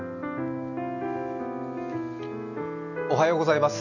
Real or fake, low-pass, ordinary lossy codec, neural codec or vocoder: real; 7.2 kHz; none; none